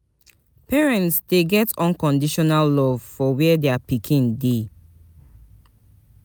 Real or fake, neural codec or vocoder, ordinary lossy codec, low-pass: real; none; none; none